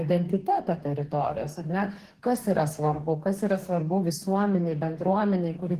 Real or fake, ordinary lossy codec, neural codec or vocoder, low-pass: fake; Opus, 16 kbps; codec, 44.1 kHz, 2.6 kbps, DAC; 14.4 kHz